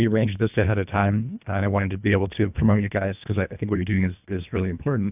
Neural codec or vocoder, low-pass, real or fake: codec, 24 kHz, 1.5 kbps, HILCodec; 3.6 kHz; fake